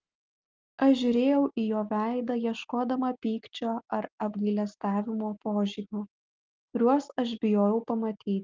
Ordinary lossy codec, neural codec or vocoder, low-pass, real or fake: Opus, 24 kbps; none; 7.2 kHz; real